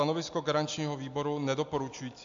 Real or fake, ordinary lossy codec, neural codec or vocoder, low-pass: real; AAC, 64 kbps; none; 7.2 kHz